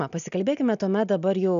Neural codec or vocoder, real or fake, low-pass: none; real; 7.2 kHz